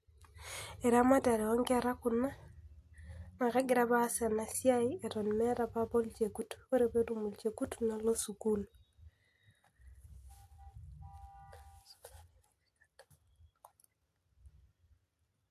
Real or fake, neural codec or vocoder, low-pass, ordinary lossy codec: real; none; 14.4 kHz; AAC, 64 kbps